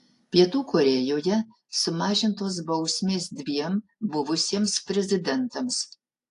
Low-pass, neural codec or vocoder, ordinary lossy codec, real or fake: 10.8 kHz; none; AAC, 48 kbps; real